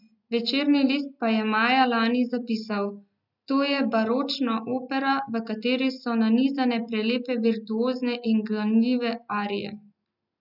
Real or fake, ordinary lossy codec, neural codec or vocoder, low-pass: real; none; none; 5.4 kHz